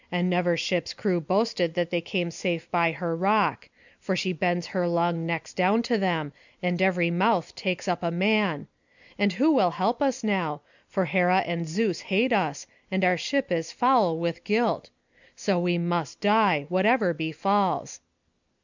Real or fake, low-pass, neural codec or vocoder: real; 7.2 kHz; none